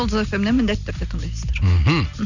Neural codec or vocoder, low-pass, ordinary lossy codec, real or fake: none; 7.2 kHz; none; real